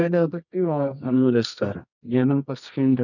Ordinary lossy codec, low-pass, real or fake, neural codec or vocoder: none; 7.2 kHz; fake; codec, 24 kHz, 0.9 kbps, WavTokenizer, medium music audio release